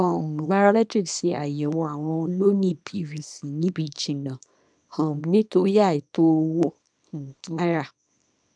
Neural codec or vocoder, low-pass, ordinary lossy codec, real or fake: codec, 24 kHz, 0.9 kbps, WavTokenizer, small release; 9.9 kHz; none; fake